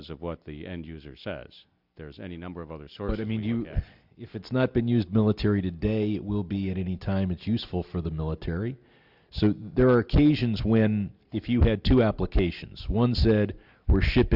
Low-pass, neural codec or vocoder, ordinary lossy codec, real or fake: 5.4 kHz; none; Opus, 64 kbps; real